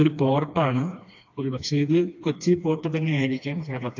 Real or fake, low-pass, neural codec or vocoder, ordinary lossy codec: fake; 7.2 kHz; codec, 16 kHz, 2 kbps, FreqCodec, smaller model; AAC, 48 kbps